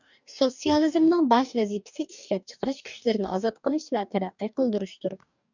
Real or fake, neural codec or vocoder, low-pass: fake; codec, 44.1 kHz, 2.6 kbps, DAC; 7.2 kHz